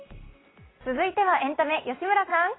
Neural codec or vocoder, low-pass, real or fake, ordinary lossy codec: none; 7.2 kHz; real; AAC, 16 kbps